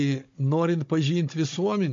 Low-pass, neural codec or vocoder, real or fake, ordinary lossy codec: 7.2 kHz; codec, 16 kHz, 16 kbps, FunCodec, trained on Chinese and English, 50 frames a second; fake; MP3, 48 kbps